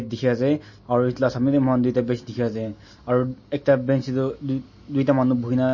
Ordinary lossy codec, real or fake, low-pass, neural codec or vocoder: MP3, 32 kbps; real; 7.2 kHz; none